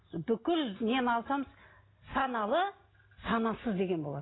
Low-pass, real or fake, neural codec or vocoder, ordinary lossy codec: 7.2 kHz; real; none; AAC, 16 kbps